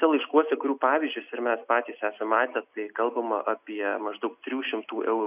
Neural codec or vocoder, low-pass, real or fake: none; 3.6 kHz; real